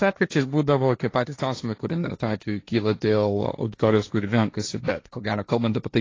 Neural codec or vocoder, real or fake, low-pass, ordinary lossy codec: codec, 16 kHz, 1.1 kbps, Voila-Tokenizer; fake; 7.2 kHz; AAC, 32 kbps